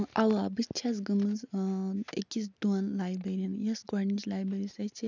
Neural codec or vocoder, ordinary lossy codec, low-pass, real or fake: none; none; 7.2 kHz; real